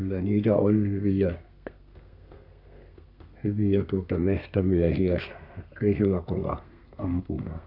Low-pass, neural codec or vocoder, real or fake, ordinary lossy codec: 5.4 kHz; codec, 32 kHz, 1.9 kbps, SNAC; fake; none